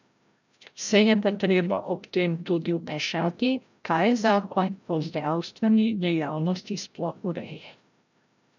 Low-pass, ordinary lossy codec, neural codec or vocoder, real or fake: 7.2 kHz; none; codec, 16 kHz, 0.5 kbps, FreqCodec, larger model; fake